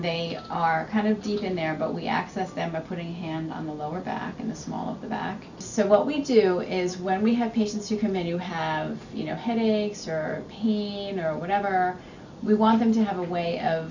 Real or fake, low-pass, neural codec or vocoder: real; 7.2 kHz; none